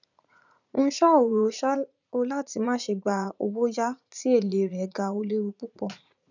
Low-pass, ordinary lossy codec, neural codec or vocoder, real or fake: 7.2 kHz; none; vocoder, 44.1 kHz, 80 mel bands, Vocos; fake